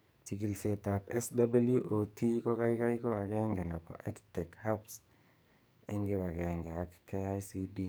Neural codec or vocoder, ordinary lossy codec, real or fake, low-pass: codec, 44.1 kHz, 2.6 kbps, SNAC; none; fake; none